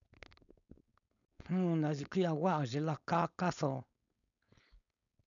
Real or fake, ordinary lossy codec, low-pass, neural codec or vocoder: fake; none; 7.2 kHz; codec, 16 kHz, 4.8 kbps, FACodec